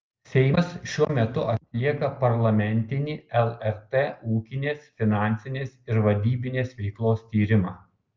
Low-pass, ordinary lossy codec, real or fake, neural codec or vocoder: 7.2 kHz; Opus, 24 kbps; real; none